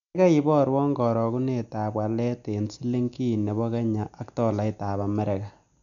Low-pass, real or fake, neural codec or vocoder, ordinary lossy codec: 7.2 kHz; real; none; none